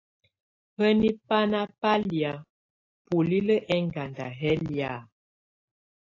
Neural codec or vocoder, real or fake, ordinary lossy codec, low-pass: none; real; AAC, 32 kbps; 7.2 kHz